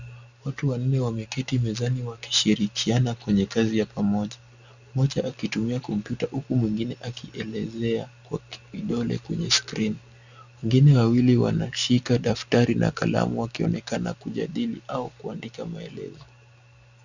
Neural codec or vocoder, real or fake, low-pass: none; real; 7.2 kHz